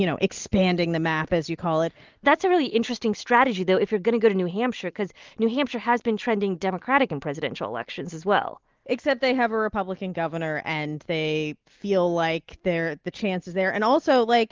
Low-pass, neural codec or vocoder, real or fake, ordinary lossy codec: 7.2 kHz; none; real; Opus, 24 kbps